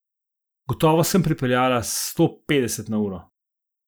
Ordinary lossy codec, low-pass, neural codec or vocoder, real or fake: none; none; none; real